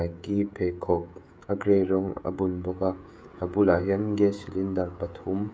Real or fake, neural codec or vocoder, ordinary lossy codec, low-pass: fake; codec, 16 kHz, 16 kbps, FreqCodec, smaller model; none; none